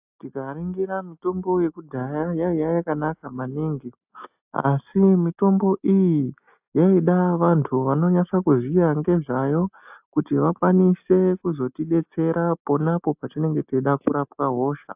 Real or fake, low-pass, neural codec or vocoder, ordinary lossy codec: real; 3.6 kHz; none; AAC, 32 kbps